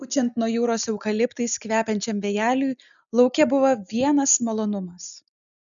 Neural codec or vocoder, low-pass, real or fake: none; 7.2 kHz; real